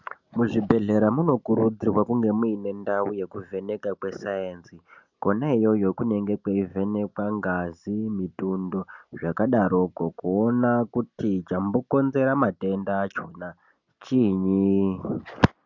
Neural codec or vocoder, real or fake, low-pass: none; real; 7.2 kHz